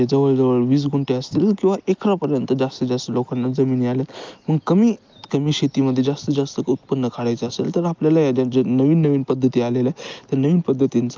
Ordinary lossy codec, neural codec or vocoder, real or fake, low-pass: Opus, 24 kbps; none; real; 7.2 kHz